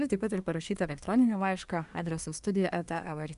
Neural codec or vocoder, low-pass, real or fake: codec, 24 kHz, 1 kbps, SNAC; 10.8 kHz; fake